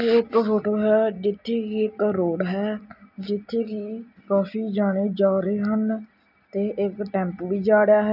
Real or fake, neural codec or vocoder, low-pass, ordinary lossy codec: real; none; 5.4 kHz; none